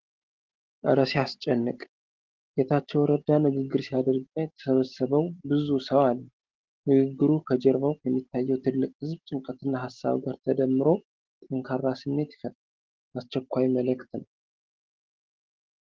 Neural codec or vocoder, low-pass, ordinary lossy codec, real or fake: none; 7.2 kHz; Opus, 16 kbps; real